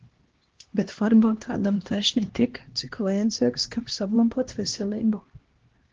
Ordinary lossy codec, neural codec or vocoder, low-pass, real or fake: Opus, 16 kbps; codec, 16 kHz, 1 kbps, X-Codec, HuBERT features, trained on LibriSpeech; 7.2 kHz; fake